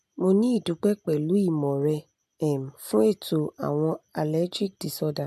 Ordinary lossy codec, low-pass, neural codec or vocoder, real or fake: none; 14.4 kHz; none; real